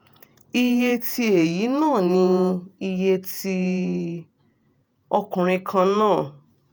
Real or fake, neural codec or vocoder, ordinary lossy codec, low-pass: fake; vocoder, 48 kHz, 128 mel bands, Vocos; none; none